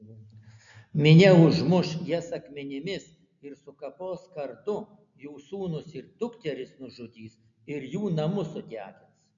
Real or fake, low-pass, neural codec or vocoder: real; 7.2 kHz; none